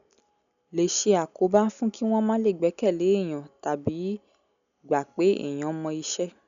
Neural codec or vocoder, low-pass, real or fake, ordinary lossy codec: none; 7.2 kHz; real; none